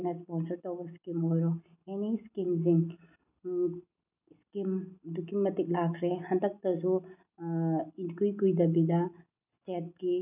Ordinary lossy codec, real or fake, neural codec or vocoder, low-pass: none; real; none; 3.6 kHz